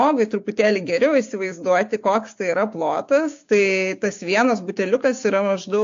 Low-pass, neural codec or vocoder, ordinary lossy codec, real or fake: 7.2 kHz; codec, 16 kHz, 6 kbps, DAC; AAC, 48 kbps; fake